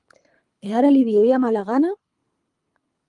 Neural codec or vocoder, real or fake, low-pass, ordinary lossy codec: codec, 24 kHz, 3 kbps, HILCodec; fake; 10.8 kHz; Opus, 32 kbps